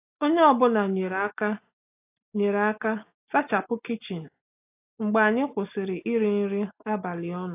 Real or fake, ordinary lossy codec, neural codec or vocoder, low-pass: real; AAC, 24 kbps; none; 3.6 kHz